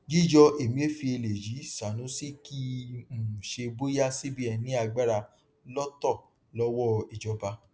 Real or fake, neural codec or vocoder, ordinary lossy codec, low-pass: real; none; none; none